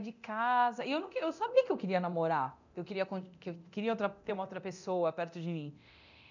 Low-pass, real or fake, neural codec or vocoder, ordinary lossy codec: 7.2 kHz; fake; codec, 24 kHz, 0.9 kbps, DualCodec; none